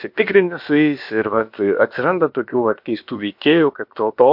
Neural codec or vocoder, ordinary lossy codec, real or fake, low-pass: codec, 16 kHz, about 1 kbps, DyCAST, with the encoder's durations; MP3, 48 kbps; fake; 5.4 kHz